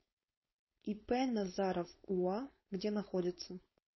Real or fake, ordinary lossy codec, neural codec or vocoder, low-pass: fake; MP3, 24 kbps; codec, 16 kHz, 4.8 kbps, FACodec; 7.2 kHz